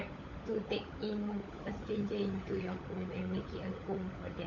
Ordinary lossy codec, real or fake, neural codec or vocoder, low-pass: MP3, 48 kbps; fake; codec, 16 kHz, 16 kbps, FunCodec, trained on LibriTTS, 50 frames a second; 7.2 kHz